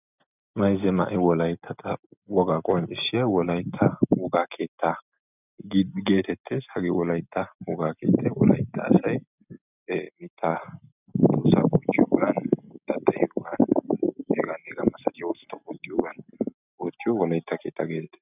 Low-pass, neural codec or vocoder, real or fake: 3.6 kHz; none; real